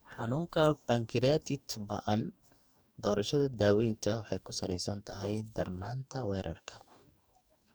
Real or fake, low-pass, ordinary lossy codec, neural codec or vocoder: fake; none; none; codec, 44.1 kHz, 2.6 kbps, DAC